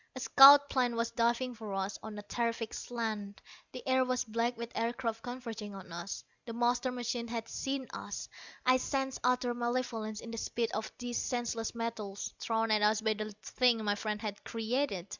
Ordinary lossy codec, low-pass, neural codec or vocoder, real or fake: Opus, 64 kbps; 7.2 kHz; none; real